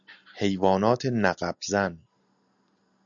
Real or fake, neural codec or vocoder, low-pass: real; none; 7.2 kHz